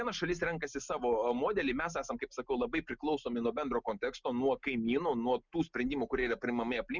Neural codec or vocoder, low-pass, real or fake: none; 7.2 kHz; real